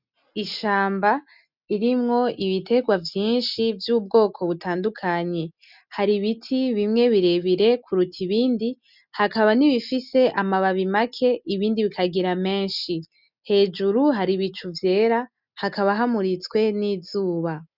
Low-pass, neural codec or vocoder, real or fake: 5.4 kHz; none; real